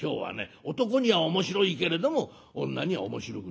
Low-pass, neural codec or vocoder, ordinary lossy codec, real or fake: none; none; none; real